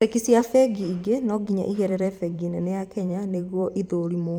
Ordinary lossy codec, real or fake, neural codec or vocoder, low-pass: none; fake; vocoder, 44.1 kHz, 128 mel bands every 512 samples, BigVGAN v2; 19.8 kHz